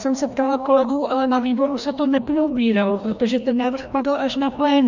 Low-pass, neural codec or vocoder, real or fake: 7.2 kHz; codec, 16 kHz, 1 kbps, FreqCodec, larger model; fake